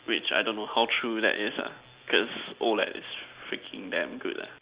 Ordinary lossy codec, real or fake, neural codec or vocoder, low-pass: Opus, 64 kbps; real; none; 3.6 kHz